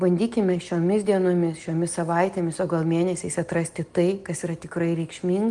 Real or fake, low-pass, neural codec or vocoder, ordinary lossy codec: real; 10.8 kHz; none; Opus, 24 kbps